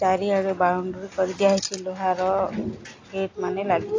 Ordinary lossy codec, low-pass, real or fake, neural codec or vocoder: AAC, 32 kbps; 7.2 kHz; real; none